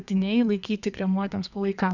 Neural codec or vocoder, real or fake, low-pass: codec, 32 kHz, 1.9 kbps, SNAC; fake; 7.2 kHz